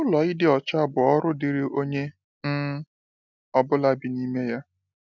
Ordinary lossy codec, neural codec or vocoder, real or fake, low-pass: none; none; real; 7.2 kHz